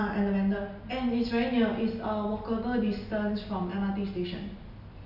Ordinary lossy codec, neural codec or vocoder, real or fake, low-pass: none; none; real; 5.4 kHz